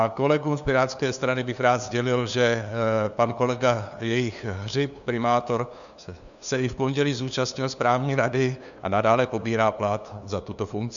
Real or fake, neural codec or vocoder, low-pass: fake; codec, 16 kHz, 2 kbps, FunCodec, trained on LibriTTS, 25 frames a second; 7.2 kHz